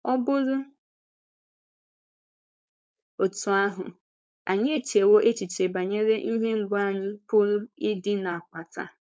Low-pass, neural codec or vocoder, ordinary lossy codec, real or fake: none; codec, 16 kHz, 4.8 kbps, FACodec; none; fake